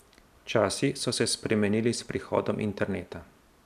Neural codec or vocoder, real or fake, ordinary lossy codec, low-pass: none; real; none; 14.4 kHz